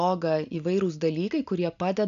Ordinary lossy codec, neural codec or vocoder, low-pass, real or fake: AAC, 96 kbps; none; 7.2 kHz; real